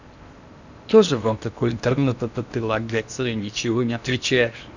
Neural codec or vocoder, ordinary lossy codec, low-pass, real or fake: codec, 16 kHz in and 24 kHz out, 0.8 kbps, FocalCodec, streaming, 65536 codes; none; 7.2 kHz; fake